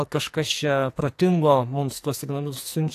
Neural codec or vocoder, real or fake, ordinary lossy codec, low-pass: codec, 44.1 kHz, 2.6 kbps, SNAC; fake; AAC, 64 kbps; 14.4 kHz